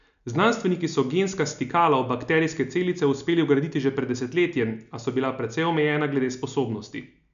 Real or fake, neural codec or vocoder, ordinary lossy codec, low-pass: real; none; none; 7.2 kHz